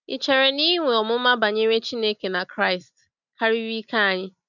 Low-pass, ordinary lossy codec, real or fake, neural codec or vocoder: 7.2 kHz; none; real; none